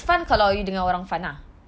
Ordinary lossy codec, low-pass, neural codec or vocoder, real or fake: none; none; none; real